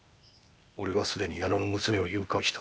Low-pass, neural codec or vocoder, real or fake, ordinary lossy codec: none; codec, 16 kHz, 0.8 kbps, ZipCodec; fake; none